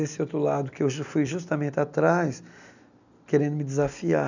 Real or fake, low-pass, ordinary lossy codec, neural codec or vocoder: real; 7.2 kHz; none; none